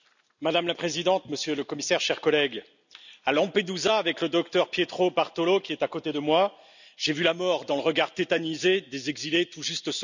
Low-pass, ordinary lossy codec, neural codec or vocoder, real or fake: 7.2 kHz; none; none; real